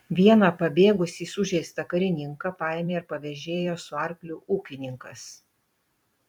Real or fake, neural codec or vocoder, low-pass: fake; vocoder, 44.1 kHz, 128 mel bands every 256 samples, BigVGAN v2; 19.8 kHz